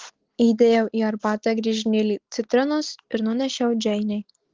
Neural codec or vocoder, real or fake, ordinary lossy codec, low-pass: none; real; Opus, 16 kbps; 7.2 kHz